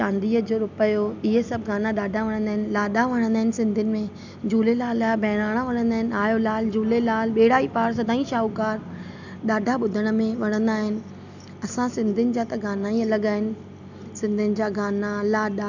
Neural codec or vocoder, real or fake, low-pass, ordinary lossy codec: none; real; 7.2 kHz; none